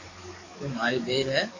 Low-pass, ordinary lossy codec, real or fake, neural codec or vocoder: 7.2 kHz; AAC, 48 kbps; fake; codec, 44.1 kHz, 7.8 kbps, DAC